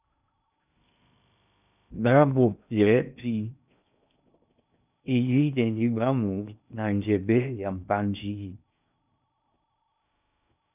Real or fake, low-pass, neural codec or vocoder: fake; 3.6 kHz; codec, 16 kHz in and 24 kHz out, 0.6 kbps, FocalCodec, streaming, 2048 codes